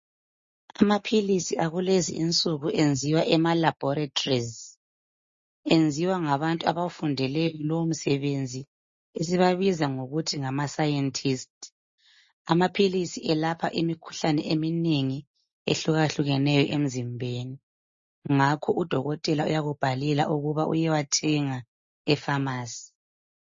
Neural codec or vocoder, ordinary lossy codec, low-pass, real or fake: none; MP3, 32 kbps; 7.2 kHz; real